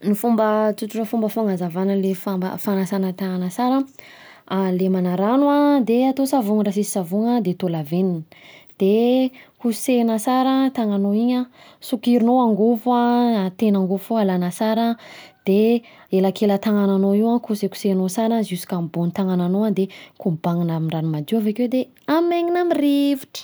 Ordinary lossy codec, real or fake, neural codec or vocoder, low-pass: none; real; none; none